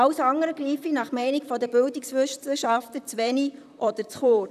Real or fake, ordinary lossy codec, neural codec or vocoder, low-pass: fake; none; vocoder, 44.1 kHz, 128 mel bands, Pupu-Vocoder; 14.4 kHz